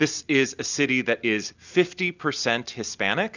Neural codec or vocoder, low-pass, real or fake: none; 7.2 kHz; real